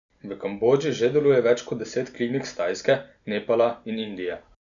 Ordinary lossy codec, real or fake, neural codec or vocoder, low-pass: none; real; none; 7.2 kHz